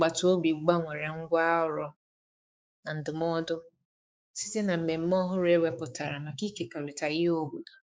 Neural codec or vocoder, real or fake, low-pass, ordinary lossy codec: codec, 16 kHz, 4 kbps, X-Codec, HuBERT features, trained on balanced general audio; fake; none; none